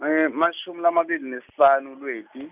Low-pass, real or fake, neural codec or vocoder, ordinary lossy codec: 3.6 kHz; real; none; none